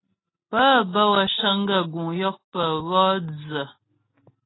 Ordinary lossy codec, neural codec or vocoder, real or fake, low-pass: AAC, 16 kbps; none; real; 7.2 kHz